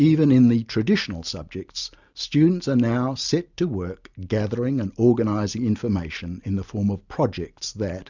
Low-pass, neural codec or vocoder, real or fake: 7.2 kHz; none; real